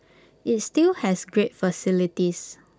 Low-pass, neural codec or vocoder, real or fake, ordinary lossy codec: none; none; real; none